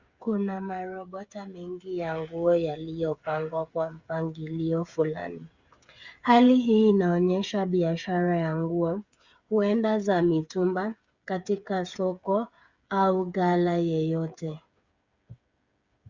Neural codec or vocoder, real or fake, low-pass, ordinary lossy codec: codec, 16 kHz, 8 kbps, FreqCodec, smaller model; fake; 7.2 kHz; Opus, 64 kbps